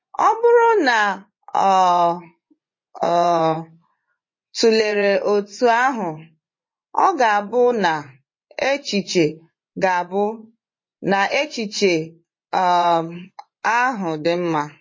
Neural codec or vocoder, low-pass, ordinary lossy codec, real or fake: vocoder, 24 kHz, 100 mel bands, Vocos; 7.2 kHz; MP3, 32 kbps; fake